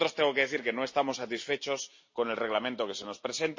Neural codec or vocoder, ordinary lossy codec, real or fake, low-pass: none; MP3, 32 kbps; real; 7.2 kHz